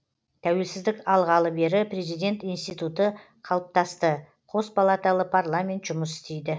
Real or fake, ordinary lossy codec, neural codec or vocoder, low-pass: real; none; none; none